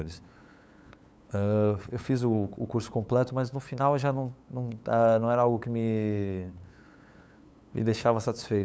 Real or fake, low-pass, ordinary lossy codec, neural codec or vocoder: fake; none; none; codec, 16 kHz, 8 kbps, FunCodec, trained on LibriTTS, 25 frames a second